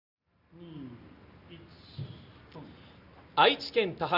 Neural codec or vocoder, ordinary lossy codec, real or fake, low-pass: none; none; real; 5.4 kHz